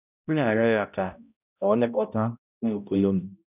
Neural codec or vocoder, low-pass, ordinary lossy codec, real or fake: codec, 16 kHz, 0.5 kbps, X-Codec, HuBERT features, trained on balanced general audio; 3.6 kHz; none; fake